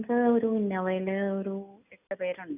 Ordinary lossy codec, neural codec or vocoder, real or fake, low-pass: none; none; real; 3.6 kHz